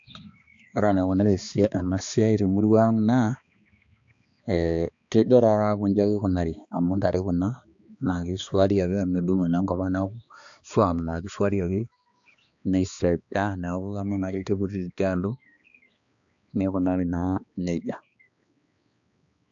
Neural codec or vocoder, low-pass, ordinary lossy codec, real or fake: codec, 16 kHz, 2 kbps, X-Codec, HuBERT features, trained on balanced general audio; 7.2 kHz; AAC, 64 kbps; fake